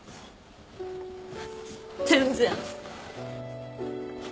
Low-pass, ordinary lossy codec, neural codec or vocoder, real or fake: none; none; none; real